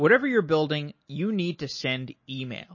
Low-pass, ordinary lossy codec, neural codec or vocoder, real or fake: 7.2 kHz; MP3, 32 kbps; none; real